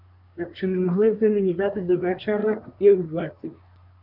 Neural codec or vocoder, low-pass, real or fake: codec, 24 kHz, 1 kbps, SNAC; 5.4 kHz; fake